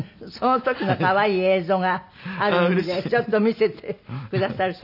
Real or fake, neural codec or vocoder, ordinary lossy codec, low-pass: real; none; none; 5.4 kHz